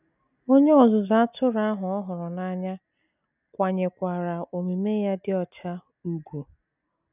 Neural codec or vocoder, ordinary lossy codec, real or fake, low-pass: none; none; real; 3.6 kHz